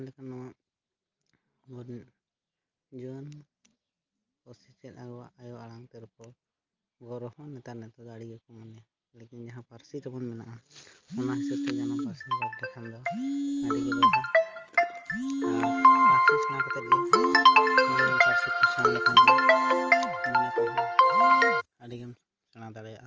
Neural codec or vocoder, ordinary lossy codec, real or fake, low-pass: none; Opus, 24 kbps; real; 7.2 kHz